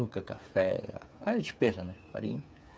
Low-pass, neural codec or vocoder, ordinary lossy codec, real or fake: none; codec, 16 kHz, 8 kbps, FreqCodec, smaller model; none; fake